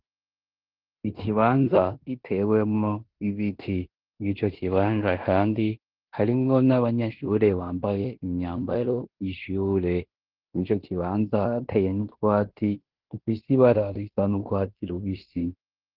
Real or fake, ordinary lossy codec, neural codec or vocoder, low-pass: fake; Opus, 16 kbps; codec, 16 kHz in and 24 kHz out, 0.9 kbps, LongCat-Audio-Codec, fine tuned four codebook decoder; 5.4 kHz